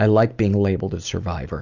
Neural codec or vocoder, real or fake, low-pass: none; real; 7.2 kHz